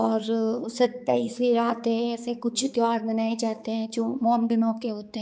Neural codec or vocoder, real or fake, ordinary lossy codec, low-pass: codec, 16 kHz, 4 kbps, X-Codec, HuBERT features, trained on balanced general audio; fake; none; none